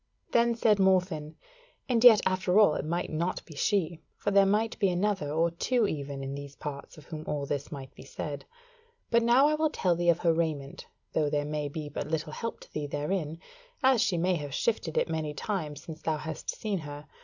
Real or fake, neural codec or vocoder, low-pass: real; none; 7.2 kHz